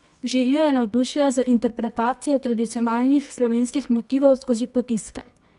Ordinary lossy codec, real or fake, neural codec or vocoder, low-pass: none; fake; codec, 24 kHz, 0.9 kbps, WavTokenizer, medium music audio release; 10.8 kHz